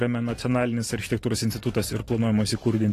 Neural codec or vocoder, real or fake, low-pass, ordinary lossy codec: vocoder, 44.1 kHz, 128 mel bands every 512 samples, BigVGAN v2; fake; 14.4 kHz; AAC, 48 kbps